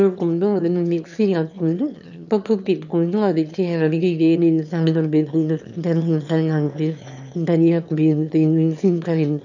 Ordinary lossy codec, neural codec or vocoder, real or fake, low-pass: none; autoencoder, 22.05 kHz, a latent of 192 numbers a frame, VITS, trained on one speaker; fake; 7.2 kHz